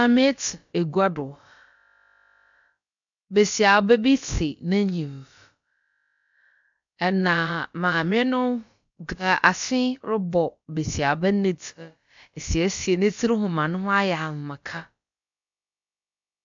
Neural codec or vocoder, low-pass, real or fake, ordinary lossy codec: codec, 16 kHz, about 1 kbps, DyCAST, with the encoder's durations; 7.2 kHz; fake; MP3, 64 kbps